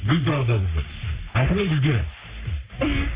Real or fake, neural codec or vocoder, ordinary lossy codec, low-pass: fake; codec, 44.1 kHz, 3.4 kbps, Pupu-Codec; Opus, 64 kbps; 3.6 kHz